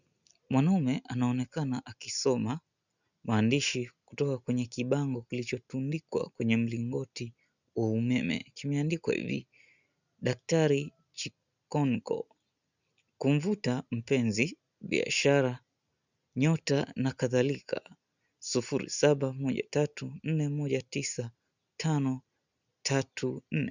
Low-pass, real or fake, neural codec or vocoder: 7.2 kHz; real; none